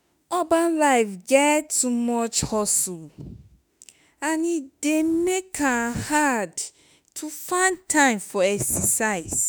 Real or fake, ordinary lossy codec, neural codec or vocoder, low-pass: fake; none; autoencoder, 48 kHz, 32 numbers a frame, DAC-VAE, trained on Japanese speech; none